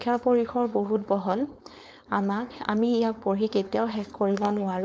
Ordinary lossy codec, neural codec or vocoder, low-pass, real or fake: none; codec, 16 kHz, 4.8 kbps, FACodec; none; fake